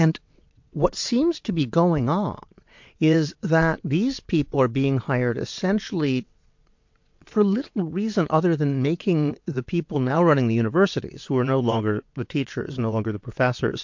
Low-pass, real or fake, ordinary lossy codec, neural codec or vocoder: 7.2 kHz; fake; MP3, 48 kbps; vocoder, 22.05 kHz, 80 mel bands, WaveNeXt